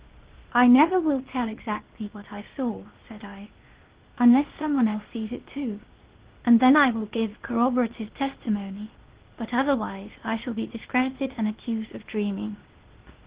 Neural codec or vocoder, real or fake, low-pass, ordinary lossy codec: codec, 16 kHz, 0.8 kbps, ZipCodec; fake; 3.6 kHz; Opus, 16 kbps